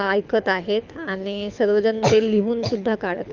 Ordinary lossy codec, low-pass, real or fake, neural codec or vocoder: none; 7.2 kHz; fake; codec, 24 kHz, 6 kbps, HILCodec